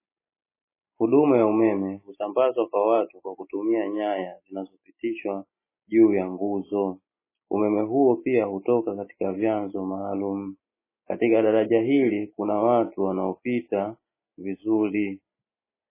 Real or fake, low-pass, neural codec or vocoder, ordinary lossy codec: real; 3.6 kHz; none; MP3, 16 kbps